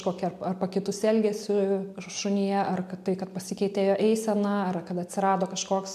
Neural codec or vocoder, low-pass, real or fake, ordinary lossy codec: none; 14.4 kHz; real; MP3, 96 kbps